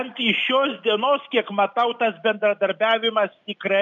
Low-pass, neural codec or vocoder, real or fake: 7.2 kHz; none; real